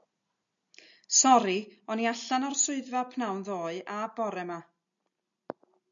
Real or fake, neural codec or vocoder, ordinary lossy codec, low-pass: real; none; MP3, 64 kbps; 7.2 kHz